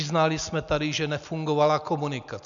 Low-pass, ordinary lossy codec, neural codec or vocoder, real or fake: 7.2 kHz; MP3, 96 kbps; none; real